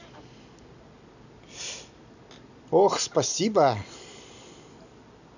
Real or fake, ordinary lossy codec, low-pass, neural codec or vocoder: real; none; 7.2 kHz; none